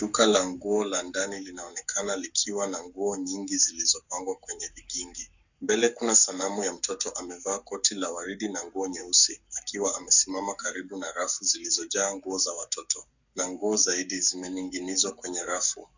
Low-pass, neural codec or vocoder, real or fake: 7.2 kHz; codec, 44.1 kHz, 7.8 kbps, Pupu-Codec; fake